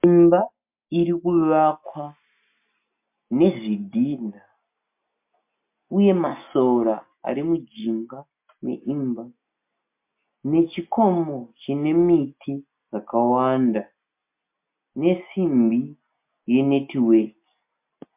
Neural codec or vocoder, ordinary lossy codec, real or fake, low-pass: none; MP3, 32 kbps; real; 3.6 kHz